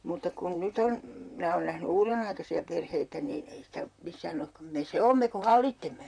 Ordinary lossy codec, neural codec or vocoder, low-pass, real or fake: none; vocoder, 44.1 kHz, 128 mel bands, Pupu-Vocoder; 9.9 kHz; fake